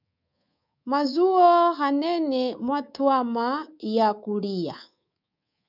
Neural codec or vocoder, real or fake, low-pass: codec, 24 kHz, 3.1 kbps, DualCodec; fake; 5.4 kHz